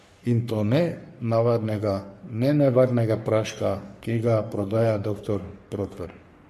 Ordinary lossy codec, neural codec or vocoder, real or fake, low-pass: MP3, 64 kbps; codec, 44.1 kHz, 3.4 kbps, Pupu-Codec; fake; 14.4 kHz